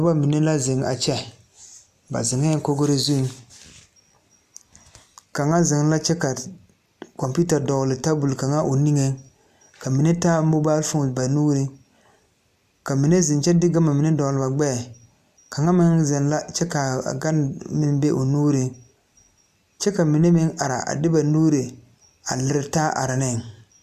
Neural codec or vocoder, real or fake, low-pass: none; real; 14.4 kHz